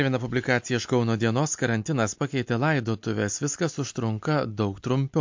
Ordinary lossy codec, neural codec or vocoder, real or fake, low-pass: MP3, 48 kbps; none; real; 7.2 kHz